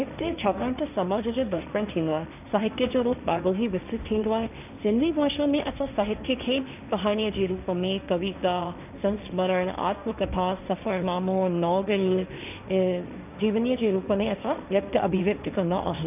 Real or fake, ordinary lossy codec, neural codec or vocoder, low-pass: fake; none; codec, 16 kHz, 1.1 kbps, Voila-Tokenizer; 3.6 kHz